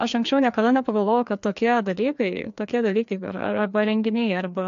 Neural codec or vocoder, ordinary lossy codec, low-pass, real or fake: codec, 16 kHz, 2 kbps, FreqCodec, larger model; AAC, 64 kbps; 7.2 kHz; fake